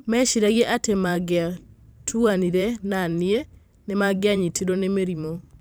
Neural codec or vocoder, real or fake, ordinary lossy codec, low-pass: vocoder, 44.1 kHz, 128 mel bands every 512 samples, BigVGAN v2; fake; none; none